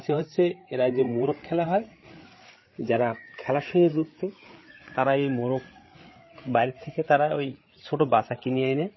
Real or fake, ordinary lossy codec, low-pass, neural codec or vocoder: fake; MP3, 24 kbps; 7.2 kHz; codec, 16 kHz, 8 kbps, FreqCodec, larger model